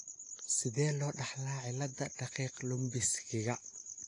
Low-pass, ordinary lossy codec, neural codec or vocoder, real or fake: 10.8 kHz; AAC, 48 kbps; none; real